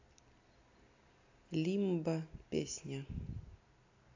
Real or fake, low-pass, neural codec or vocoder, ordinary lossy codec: real; 7.2 kHz; none; AAC, 48 kbps